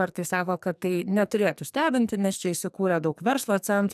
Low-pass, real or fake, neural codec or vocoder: 14.4 kHz; fake; codec, 44.1 kHz, 2.6 kbps, SNAC